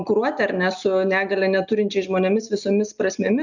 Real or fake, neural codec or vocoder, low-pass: real; none; 7.2 kHz